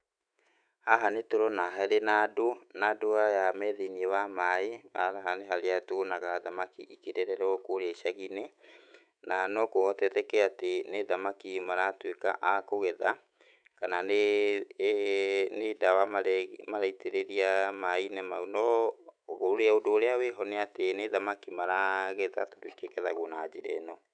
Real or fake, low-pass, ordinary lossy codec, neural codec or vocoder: fake; 9.9 kHz; none; autoencoder, 48 kHz, 128 numbers a frame, DAC-VAE, trained on Japanese speech